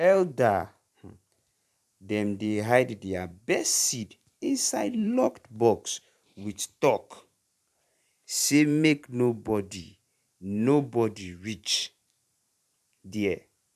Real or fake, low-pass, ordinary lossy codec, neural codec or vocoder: fake; 14.4 kHz; none; vocoder, 44.1 kHz, 128 mel bands every 512 samples, BigVGAN v2